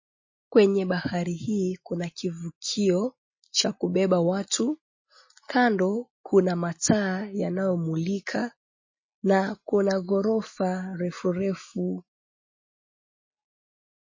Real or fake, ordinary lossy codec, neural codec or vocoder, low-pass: real; MP3, 32 kbps; none; 7.2 kHz